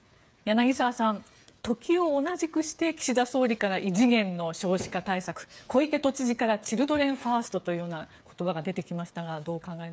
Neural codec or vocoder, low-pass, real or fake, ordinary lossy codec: codec, 16 kHz, 8 kbps, FreqCodec, smaller model; none; fake; none